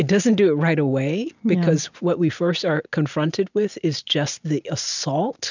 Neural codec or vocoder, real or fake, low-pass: none; real; 7.2 kHz